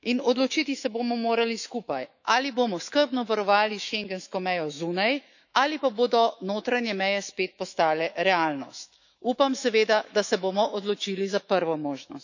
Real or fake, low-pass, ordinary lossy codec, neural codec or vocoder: fake; 7.2 kHz; none; autoencoder, 48 kHz, 128 numbers a frame, DAC-VAE, trained on Japanese speech